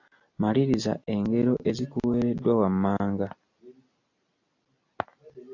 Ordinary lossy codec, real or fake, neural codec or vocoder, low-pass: AAC, 48 kbps; real; none; 7.2 kHz